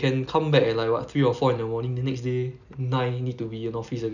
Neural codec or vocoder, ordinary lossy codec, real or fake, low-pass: none; none; real; 7.2 kHz